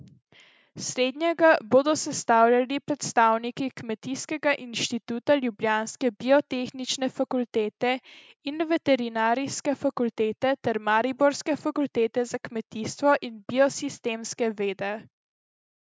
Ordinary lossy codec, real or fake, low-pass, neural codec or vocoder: none; real; none; none